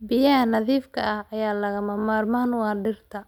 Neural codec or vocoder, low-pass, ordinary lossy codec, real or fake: none; 19.8 kHz; none; real